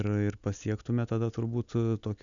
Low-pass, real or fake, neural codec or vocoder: 7.2 kHz; real; none